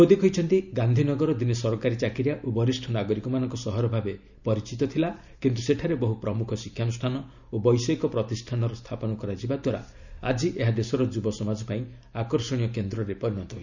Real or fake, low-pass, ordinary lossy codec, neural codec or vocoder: real; 7.2 kHz; none; none